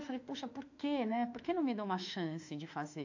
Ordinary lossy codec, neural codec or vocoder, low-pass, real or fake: AAC, 48 kbps; autoencoder, 48 kHz, 32 numbers a frame, DAC-VAE, trained on Japanese speech; 7.2 kHz; fake